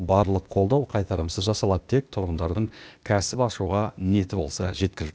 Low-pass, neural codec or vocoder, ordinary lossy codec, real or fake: none; codec, 16 kHz, 0.8 kbps, ZipCodec; none; fake